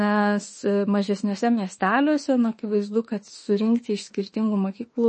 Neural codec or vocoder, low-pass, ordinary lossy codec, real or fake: autoencoder, 48 kHz, 32 numbers a frame, DAC-VAE, trained on Japanese speech; 10.8 kHz; MP3, 32 kbps; fake